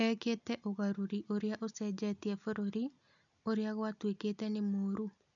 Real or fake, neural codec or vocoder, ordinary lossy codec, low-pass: real; none; none; 7.2 kHz